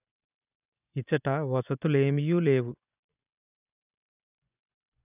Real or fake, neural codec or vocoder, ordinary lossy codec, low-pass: fake; vocoder, 24 kHz, 100 mel bands, Vocos; none; 3.6 kHz